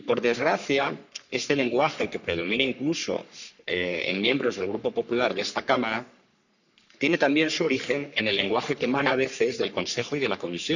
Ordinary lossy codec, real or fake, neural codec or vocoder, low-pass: none; fake; codec, 44.1 kHz, 3.4 kbps, Pupu-Codec; 7.2 kHz